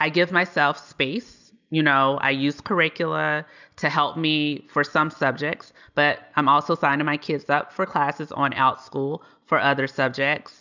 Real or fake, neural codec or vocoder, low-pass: real; none; 7.2 kHz